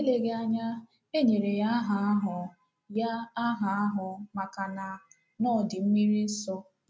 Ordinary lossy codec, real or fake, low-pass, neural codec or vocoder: none; real; none; none